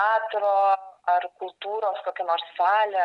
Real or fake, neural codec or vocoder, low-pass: real; none; 10.8 kHz